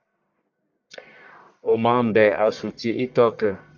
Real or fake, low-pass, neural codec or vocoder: fake; 7.2 kHz; codec, 44.1 kHz, 1.7 kbps, Pupu-Codec